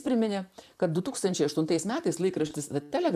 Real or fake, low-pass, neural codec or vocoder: fake; 14.4 kHz; vocoder, 44.1 kHz, 128 mel bands, Pupu-Vocoder